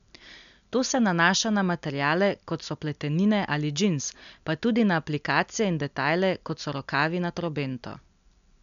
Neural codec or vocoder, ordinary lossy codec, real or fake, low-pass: none; none; real; 7.2 kHz